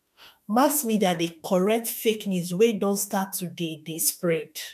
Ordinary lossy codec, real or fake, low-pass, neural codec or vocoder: none; fake; 14.4 kHz; autoencoder, 48 kHz, 32 numbers a frame, DAC-VAE, trained on Japanese speech